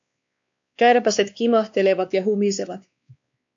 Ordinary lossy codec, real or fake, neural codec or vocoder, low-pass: AAC, 64 kbps; fake; codec, 16 kHz, 2 kbps, X-Codec, WavLM features, trained on Multilingual LibriSpeech; 7.2 kHz